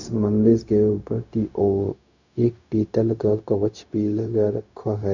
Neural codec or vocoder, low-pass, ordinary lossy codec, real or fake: codec, 16 kHz, 0.4 kbps, LongCat-Audio-Codec; 7.2 kHz; none; fake